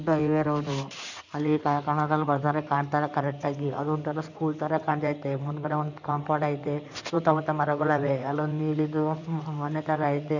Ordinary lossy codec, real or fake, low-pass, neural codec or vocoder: none; fake; 7.2 kHz; codec, 16 kHz in and 24 kHz out, 2.2 kbps, FireRedTTS-2 codec